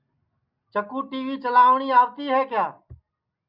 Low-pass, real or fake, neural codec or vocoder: 5.4 kHz; real; none